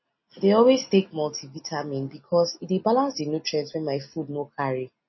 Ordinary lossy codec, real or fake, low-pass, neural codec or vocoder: MP3, 24 kbps; real; 7.2 kHz; none